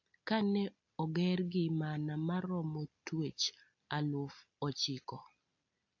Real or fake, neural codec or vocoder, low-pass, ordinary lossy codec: real; none; 7.2 kHz; AAC, 48 kbps